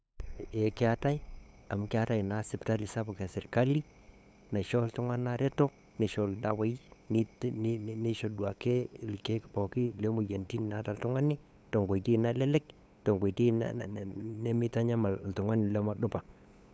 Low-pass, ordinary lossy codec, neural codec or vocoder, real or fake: none; none; codec, 16 kHz, 8 kbps, FunCodec, trained on LibriTTS, 25 frames a second; fake